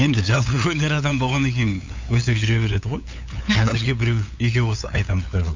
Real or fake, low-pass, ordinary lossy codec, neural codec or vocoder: fake; 7.2 kHz; MP3, 64 kbps; codec, 16 kHz, 8 kbps, FunCodec, trained on LibriTTS, 25 frames a second